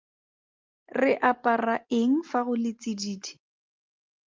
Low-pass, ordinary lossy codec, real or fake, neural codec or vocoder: 7.2 kHz; Opus, 32 kbps; real; none